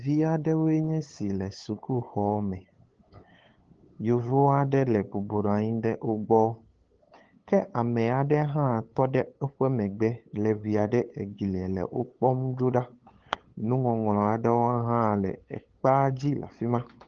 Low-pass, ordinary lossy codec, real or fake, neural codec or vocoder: 7.2 kHz; Opus, 16 kbps; fake; codec, 16 kHz, 8 kbps, FunCodec, trained on LibriTTS, 25 frames a second